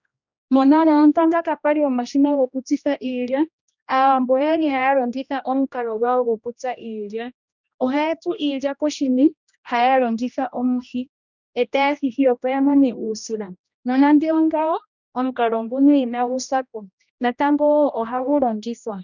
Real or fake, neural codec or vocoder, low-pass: fake; codec, 16 kHz, 1 kbps, X-Codec, HuBERT features, trained on general audio; 7.2 kHz